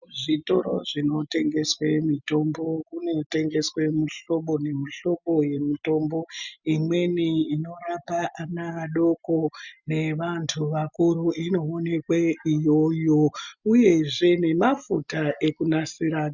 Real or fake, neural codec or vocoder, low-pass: real; none; 7.2 kHz